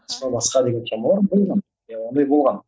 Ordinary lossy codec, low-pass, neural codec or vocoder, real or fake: none; none; none; real